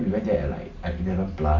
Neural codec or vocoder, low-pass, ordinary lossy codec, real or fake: none; 7.2 kHz; none; real